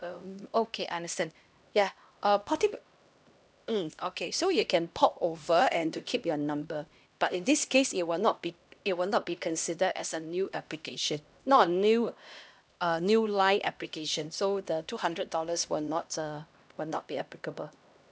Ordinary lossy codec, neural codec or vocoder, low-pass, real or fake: none; codec, 16 kHz, 1 kbps, X-Codec, HuBERT features, trained on LibriSpeech; none; fake